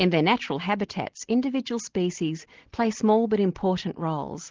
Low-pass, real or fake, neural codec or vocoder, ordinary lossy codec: 7.2 kHz; real; none; Opus, 16 kbps